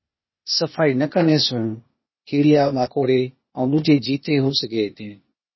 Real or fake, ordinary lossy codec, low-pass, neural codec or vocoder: fake; MP3, 24 kbps; 7.2 kHz; codec, 16 kHz, 0.8 kbps, ZipCodec